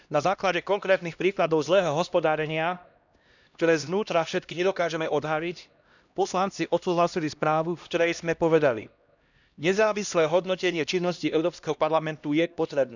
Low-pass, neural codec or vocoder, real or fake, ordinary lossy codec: 7.2 kHz; codec, 16 kHz, 1 kbps, X-Codec, HuBERT features, trained on LibriSpeech; fake; none